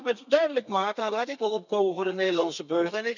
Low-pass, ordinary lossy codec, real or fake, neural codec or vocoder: 7.2 kHz; none; fake; codec, 32 kHz, 1.9 kbps, SNAC